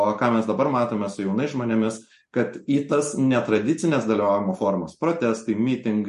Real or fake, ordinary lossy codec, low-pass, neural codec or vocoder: real; MP3, 48 kbps; 14.4 kHz; none